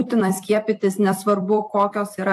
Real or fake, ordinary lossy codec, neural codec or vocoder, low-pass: fake; AAC, 64 kbps; vocoder, 44.1 kHz, 128 mel bands every 256 samples, BigVGAN v2; 14.4 kHz